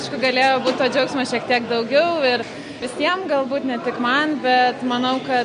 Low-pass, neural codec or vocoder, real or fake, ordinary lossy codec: 9.9 kHz; none; real; MP3, 48 kbps